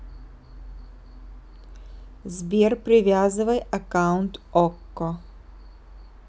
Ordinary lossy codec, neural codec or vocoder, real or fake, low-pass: none; none; real; none